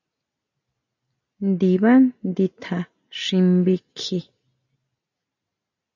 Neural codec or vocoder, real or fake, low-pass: none; real; 7.2 kHz